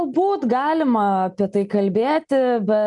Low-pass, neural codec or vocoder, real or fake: 10.8 kHz; none; real